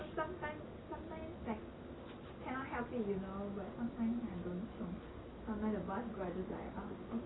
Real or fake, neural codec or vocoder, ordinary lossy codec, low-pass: real; none; AAC, 16 kbps; 7.2 kHz